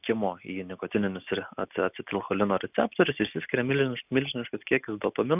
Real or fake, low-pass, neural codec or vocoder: real; 3.6 kHz; none